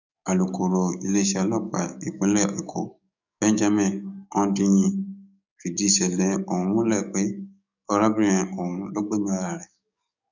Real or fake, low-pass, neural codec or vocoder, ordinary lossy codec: fake; 7.2 kHz; codec, 44.1 kHz, 7.8 kbps, DAC; none